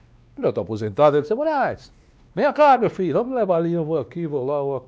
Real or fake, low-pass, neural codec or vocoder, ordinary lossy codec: fake; none; codec, 16 kHz, 2 kbps, X-Codec, WavLM features, trained on Multilingual LibriSpeech; none